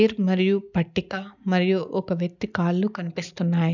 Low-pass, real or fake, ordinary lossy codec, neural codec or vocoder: 7.2 kHz; real; none; none